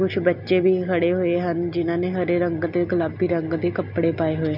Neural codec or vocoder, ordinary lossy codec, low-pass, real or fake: none; none; 5.4 kHz; real